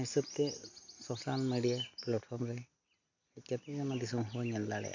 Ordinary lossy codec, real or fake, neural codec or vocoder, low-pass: AAC, 48 kbps; real; none; 7.2 kHz